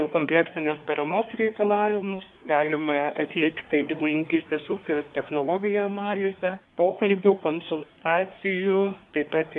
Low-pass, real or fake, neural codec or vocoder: 10.8 kHz; fake; codec, 24 kHz, 1 kbps, SNAC